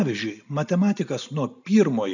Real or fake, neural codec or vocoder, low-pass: real; none; 7.2 kHz